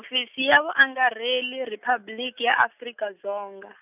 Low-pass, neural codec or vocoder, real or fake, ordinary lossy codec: 3.6 kHz; none; real; none